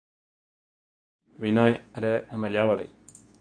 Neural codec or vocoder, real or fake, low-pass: codec, 24 kHz, 0.9 kbps, WavTokenizer, medium speech release version 2; fake; 9.9 kHz